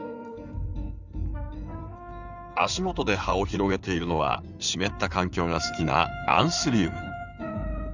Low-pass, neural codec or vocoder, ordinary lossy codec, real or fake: 7.2 kHz; codec, 16 kHz in and 24 kHz out, 2.2 kbps, FireRedTTS-2 codec; none; fake